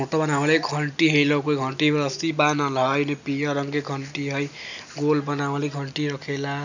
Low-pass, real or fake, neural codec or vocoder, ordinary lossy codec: 7.2 kHz; real; none; none